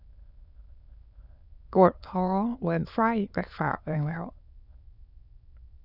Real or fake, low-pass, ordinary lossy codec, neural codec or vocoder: fake; 5.4 kHz; none; autoencoder, 22.05 kHz, a latent of 192 numbers a frame, VITS, trained on many speakers